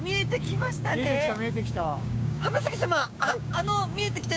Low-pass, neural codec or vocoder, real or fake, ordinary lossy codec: none; codec, 16 kHz, 6 kbps, DAC; fake; none